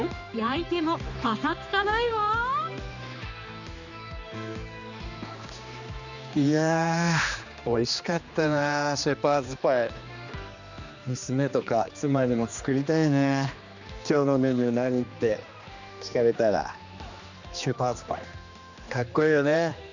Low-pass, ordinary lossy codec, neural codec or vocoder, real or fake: 7.2 kHz; none; codec, 16 kHz, 2 kbps, X-Codec, HuBERT features, trained on general audio; fake